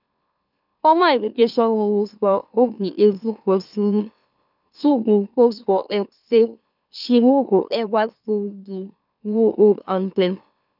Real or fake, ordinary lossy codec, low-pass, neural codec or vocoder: fake; none; 5.4 kHz; autoencoder, 44.1 kHz, a latent of 192 numbers a frame, MeloTTS